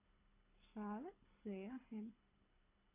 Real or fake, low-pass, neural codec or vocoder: fake; 3.6 kHz; codec, 24 kHz, 6 kbps, HILCodec